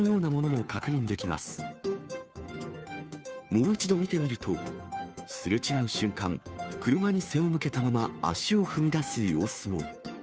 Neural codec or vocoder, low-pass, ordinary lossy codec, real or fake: codec, 16 kHz, 2 kbps, FunCodec, trained on Chinese and English, 25 frames a second; none; none; fake